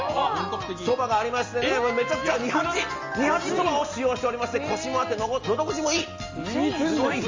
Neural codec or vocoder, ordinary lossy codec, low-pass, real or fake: none; Opus, 32 kbps; 7.2 kHz; real